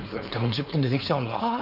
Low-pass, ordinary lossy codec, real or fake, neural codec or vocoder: 5.4 kHz; none; fake; codec, 16 kHz, 4.8 kbps, FACodec